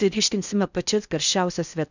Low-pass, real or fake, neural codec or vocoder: 7.2 kHz; fake; codec, 16 kHz in and 24 kHz out, 0.6 kbps, FocalCodec, streaming, 4096 codes